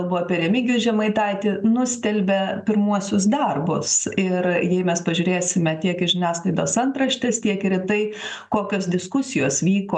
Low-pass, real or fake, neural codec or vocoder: 10.8 kHz; real; none